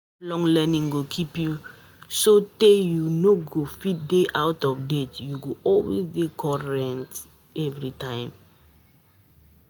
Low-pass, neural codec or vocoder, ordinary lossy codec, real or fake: none; none; none; real